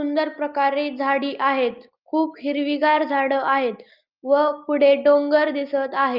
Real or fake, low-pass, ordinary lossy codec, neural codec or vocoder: real; 5.4 kHz; Opus, 32 kbps; none